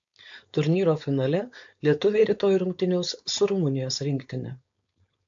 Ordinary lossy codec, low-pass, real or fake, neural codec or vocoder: AAC, 64 kbps; 7.2 kHz; fake; codec, 16 kHz, 4.8 kbps, FACodec